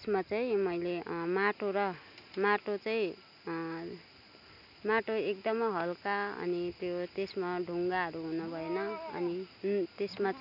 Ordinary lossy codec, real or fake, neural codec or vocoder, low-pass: none; real; none; 5.4 kHz